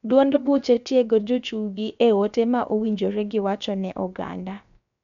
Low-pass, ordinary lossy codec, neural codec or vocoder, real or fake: 7.2 kHz; none; codec, 16 kHz, about 1 kbps, DyCAST, with the encoder's durations; fake